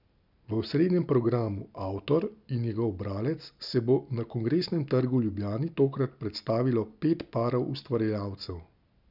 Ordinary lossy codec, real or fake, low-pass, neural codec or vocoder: none; real; 5.4 kHz; none